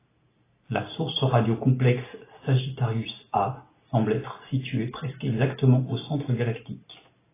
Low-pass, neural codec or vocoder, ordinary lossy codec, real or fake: 3.6 kHz; none; AAC, 16 kbps; real